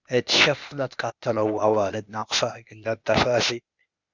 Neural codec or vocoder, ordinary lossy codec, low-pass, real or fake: codec, 16 kHz, 0.8 kbps, ZipCodec; Opus, 64 kbps; 7.2 kHz; fake